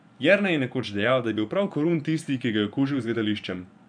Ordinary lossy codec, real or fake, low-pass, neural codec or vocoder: none; fake; 9.9 kHz; vocoder, 24 kHz, 100 mel bands, Vocos